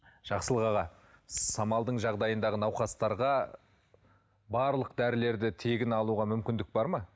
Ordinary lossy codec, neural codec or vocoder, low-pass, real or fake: none; none; none; real